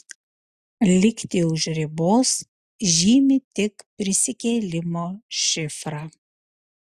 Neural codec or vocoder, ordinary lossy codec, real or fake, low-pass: none; Opus, 64 kbps; real; 14.4 kHz